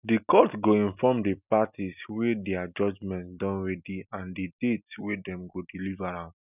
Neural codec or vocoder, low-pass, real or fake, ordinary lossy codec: none; 3.6 kHz; real; none